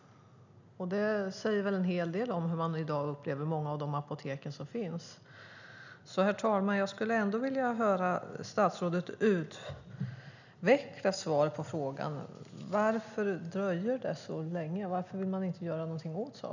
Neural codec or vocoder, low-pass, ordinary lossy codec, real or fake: none; 7.2 kHz; none; real